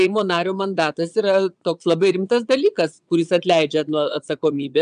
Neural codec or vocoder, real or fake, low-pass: vocoder, 22.05 kHz, 80 mel bands, Vocos; fake; 9.9 kHz